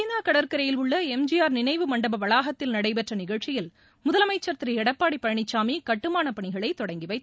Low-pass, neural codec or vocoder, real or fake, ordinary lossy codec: none; none; real; none